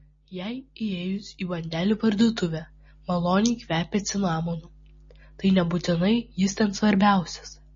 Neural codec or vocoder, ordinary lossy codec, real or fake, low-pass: none; MP3, 32 kbps; real; 7.2 kHz